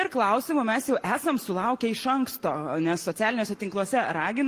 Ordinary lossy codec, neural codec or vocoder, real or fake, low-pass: Opus, 24 kbps; none; real; 14.4 kHz